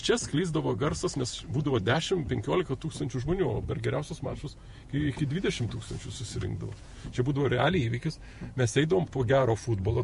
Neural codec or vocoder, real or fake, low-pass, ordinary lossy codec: vocoder, 48 kHz, 128 mel bands, Vocos; fake; 14.4 kHz; MP3, 48 kbps